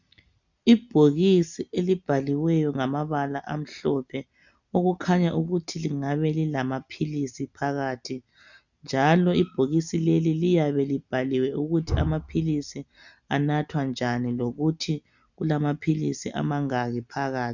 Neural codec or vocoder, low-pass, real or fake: none; 7.2 kHz; real